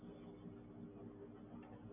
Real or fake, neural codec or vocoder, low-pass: real; none; 3.6 kHz